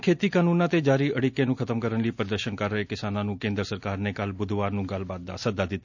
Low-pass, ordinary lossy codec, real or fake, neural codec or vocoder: 7.2 kHz; none; real; none